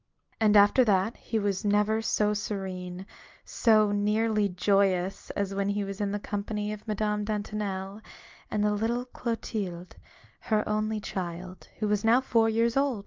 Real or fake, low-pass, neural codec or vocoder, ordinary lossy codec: real; 7.2 kHz; none; Opus, 24 kbps